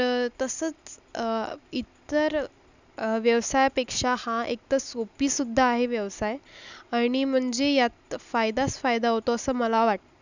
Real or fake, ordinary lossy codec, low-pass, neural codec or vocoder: real; none; 7.2 kHz; none